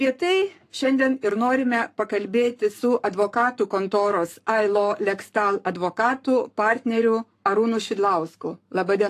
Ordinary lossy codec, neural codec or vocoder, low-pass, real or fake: AAC, 64 kbps; codec, 44.1 kHz, 7.8 kbps, Pupu-Codec; 14.4 kHz; fake